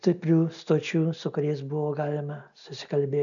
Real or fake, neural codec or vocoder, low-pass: real; none; 7.2 kHz